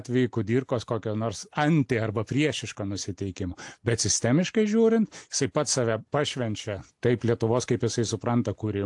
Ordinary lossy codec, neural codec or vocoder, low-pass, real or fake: AAC, 64 kbps; none; 10.8 kHz; real